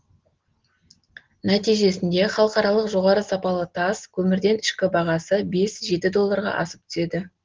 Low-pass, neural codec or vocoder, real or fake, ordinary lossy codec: 7.2 kHz; none; real; Opus, 16 kbps